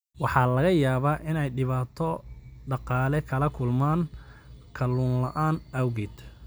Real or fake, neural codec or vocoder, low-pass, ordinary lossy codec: real; none; none; none